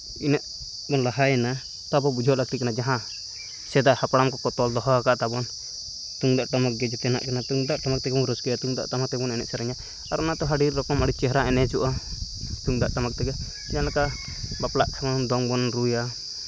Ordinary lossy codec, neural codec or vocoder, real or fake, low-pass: none; none; real; none